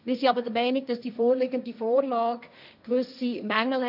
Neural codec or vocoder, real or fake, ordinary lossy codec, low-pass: codec, 16 kHz, 1.1 kbps, Voila-Tokenizer; fake; none; 5.4 kHz